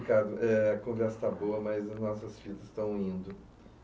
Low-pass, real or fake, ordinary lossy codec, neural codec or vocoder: none; real; none; none